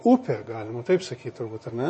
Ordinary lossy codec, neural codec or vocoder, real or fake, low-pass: MP3, 32 kbps; vocoder, 24 kHz, 100 mel bands, Vocos; fake; 10.8 kHz